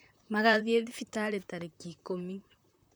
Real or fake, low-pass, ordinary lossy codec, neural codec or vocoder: fake; none; none; vocoder, 44.1 kHz, 128 mel bands, Pupu-Vocoder